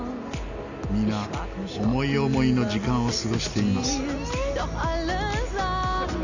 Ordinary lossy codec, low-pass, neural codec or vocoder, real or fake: none; 7.2 kHz; none; real